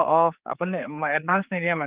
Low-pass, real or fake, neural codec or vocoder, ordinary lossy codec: 3.6 kHz; fake; codec, 16 kHz, 4 kbps, X-Codec, HuBERT features, trained on general audio; Opus, 32 kbps